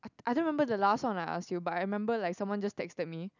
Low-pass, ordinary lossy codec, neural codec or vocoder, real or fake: 7.2 kHz; none; none; real